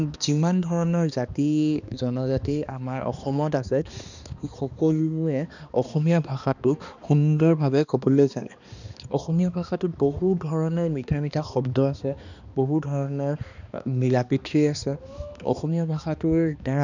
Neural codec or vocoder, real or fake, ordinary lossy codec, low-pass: codec, 16 kHz, 2 kbps, X-Codec, HuBERT features, trained on balanced general audio; fake; none; 7.2 kHz